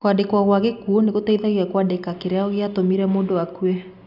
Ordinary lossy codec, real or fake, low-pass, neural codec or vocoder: none; real; 5.4 kHz; none